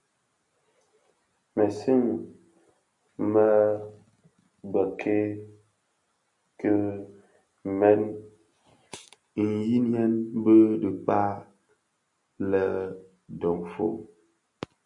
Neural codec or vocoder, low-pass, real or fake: none; 10.8 kHz; real